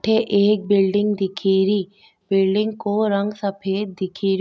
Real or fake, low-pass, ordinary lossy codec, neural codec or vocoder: real; none; none; none